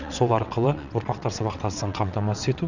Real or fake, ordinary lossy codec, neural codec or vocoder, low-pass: fake; none; vocoder, 22.05 kHz, 80 mel bands, WaveNeXt; 7.2 kHz